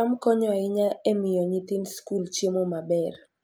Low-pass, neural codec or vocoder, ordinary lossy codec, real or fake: none; none; none; real